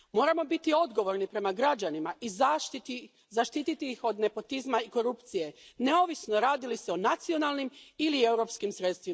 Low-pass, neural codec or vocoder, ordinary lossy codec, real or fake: none; none; none; real